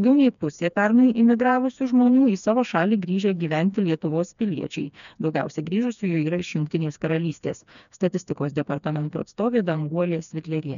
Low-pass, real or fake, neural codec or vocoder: 7.2 kHz; fake; codec, 16 kHz, 2 kbps, FreqCodec, smaller model